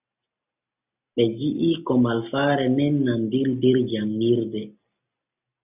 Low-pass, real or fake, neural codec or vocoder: 3.6 kHz; real; none